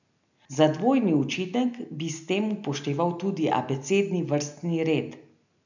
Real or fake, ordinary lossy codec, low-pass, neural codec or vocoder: real; none; 7.2 kHz; none